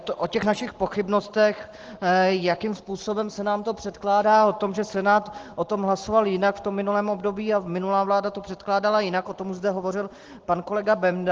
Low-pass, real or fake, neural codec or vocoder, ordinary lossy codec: 7.2 kHz; real; none; Opus, 16 kbps